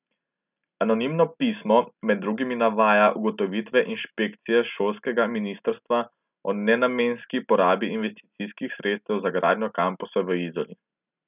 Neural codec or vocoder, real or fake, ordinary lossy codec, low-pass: none; real; none; 3.6 kHz